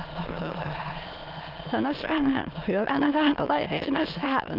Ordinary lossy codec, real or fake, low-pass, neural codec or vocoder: Opus, 24 kbps; fake; 5.4 kHz; autoencoder, 22.05 kHz, a latent of 192 numbers a frame, VITS, trained on many speakers